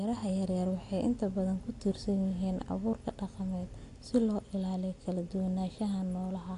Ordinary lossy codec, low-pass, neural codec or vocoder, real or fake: AAC, 48 kbps; 10.8 kHz; none; real